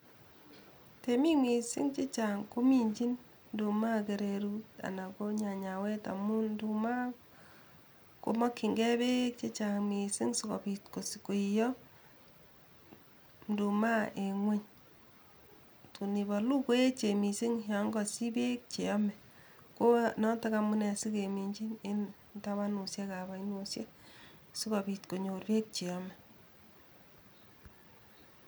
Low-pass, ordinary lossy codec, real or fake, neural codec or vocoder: none; none; real; none